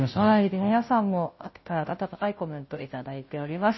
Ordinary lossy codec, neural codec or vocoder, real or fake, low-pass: MP3, 24 kbps; codec, 16 kHz, 0.5 kbps, FunCodec, trained on Chinese and English, 25 frames a second; fake; 7.2 kHz